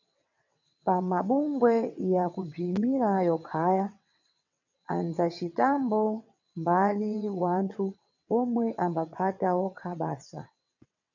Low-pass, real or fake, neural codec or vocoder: 7.2 kHz; fake; vocoder, 22.05 kHz, 80 mel bands, WaveNeXt